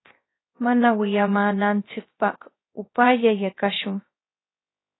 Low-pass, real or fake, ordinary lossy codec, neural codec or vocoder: 7.2 kHz; fake; AAC, 16 kbps; codec, 16 kHz, 0.3 kbps, FocalCodec